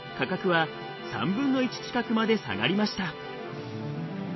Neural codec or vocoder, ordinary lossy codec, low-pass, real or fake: none; MP3, 24 kbps; 7.2 kHz; real